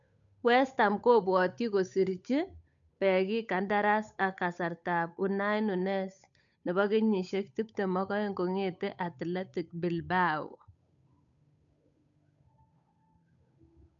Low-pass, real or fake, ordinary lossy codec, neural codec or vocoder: 7.2 kHz; fake; none; codec, 16 kHz, 8 kbps, FunCodec, trained on Chinese and English, 25 frames a second